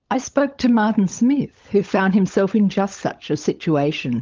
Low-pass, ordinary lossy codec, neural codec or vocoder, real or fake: 7.2 kHz; Opus, 24 kbps; codec, 16 kHz, 16 kbps, FunCodec, trained on LibriTTS, 50 frames a second; fake